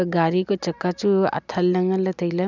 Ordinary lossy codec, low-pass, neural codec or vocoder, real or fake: none; 7.2 kHz; none; real